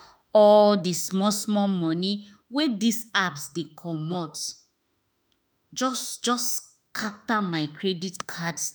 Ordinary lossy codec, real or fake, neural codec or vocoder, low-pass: none; fake; autoencoder, 48 kHz, 32 numbers a frame, DAC-VAE, trained on Japanese speech; none